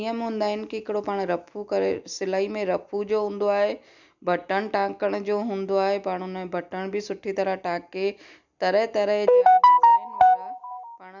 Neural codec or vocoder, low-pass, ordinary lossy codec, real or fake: none; 7.2 kHz; none; real